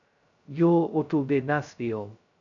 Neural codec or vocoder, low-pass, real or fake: codec, 16 kHz, 0.2 kbps, FocalCodec; 7.2 kHz; fake